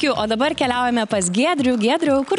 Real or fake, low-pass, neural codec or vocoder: real; 10.8 kHz; none